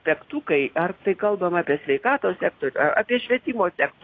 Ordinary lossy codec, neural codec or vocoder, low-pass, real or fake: AAC, 32 kbps; none; 7.2 kHz; real